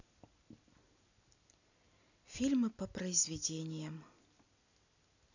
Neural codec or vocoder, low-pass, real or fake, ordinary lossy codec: none; 7.2 kHz; real; none